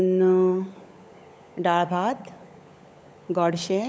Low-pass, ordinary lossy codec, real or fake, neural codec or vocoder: none; none; fake; codec, 16 kHz, 16 kbps, FunCodec, trained on LibriTTS, 50 frames a second